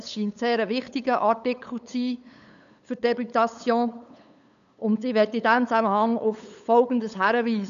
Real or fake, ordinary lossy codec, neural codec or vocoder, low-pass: fake; none; codec, 16 kHz, 8 kbps, FunCodec, trained on LibriTTS, 25 frames a second; 7.2 kHz